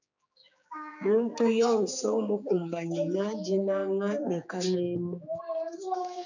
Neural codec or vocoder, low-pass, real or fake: codec, 16 kHz, 4 kbps, X-Codec, HuBERT features, trained on general audio; 7.2 kHz; fake